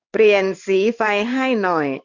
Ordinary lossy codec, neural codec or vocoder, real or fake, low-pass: none; codec, 16 kHz in and 24 kHz out, 1 kbps, XY-Tokenizer; fake; 7.2 kHz